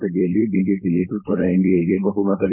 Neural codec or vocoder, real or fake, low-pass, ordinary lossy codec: codec, 16 kHz, 4.8 kbps, FACodec; fake; 3.6 kHz; MP3, 32 kbps